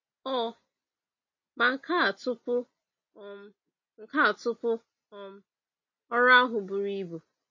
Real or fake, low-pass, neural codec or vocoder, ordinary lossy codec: real; 7.2 kHz; none; MP3, 32 kbps